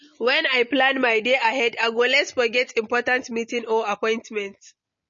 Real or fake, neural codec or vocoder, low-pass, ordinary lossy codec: real; none; 7.2 kHz; MP3, 32 kbps